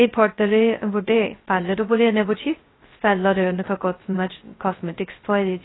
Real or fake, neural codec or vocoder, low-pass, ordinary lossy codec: fake; codec, 16 kHz, 0.2 kbps, FocalCodec; 7.2 kHz; AAC, 16 kbps